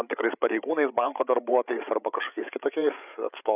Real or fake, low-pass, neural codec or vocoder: fake; 3.6 kHz; codec, 16 kHz, 8 kbps, FreqCodec, larger model